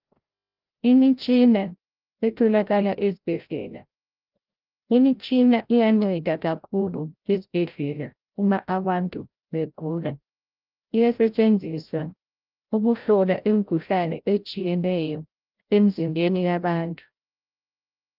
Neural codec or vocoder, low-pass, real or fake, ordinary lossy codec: codec, 16 kHz, 0.5 kbps, FreqCodec, larger model; 5.4 kHz; fake; Opus, 24 kbps